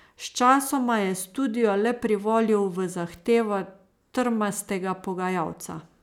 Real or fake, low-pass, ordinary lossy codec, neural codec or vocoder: real; 19.8 kHz; none; none